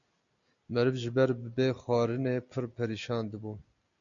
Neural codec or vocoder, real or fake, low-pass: none; real; 7.2 kHz